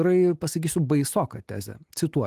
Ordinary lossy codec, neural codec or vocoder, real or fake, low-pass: Opus, 24 kbps; none; real; 14.4 kHz